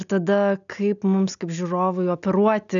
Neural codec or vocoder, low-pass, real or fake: none; 7.2 kHz; real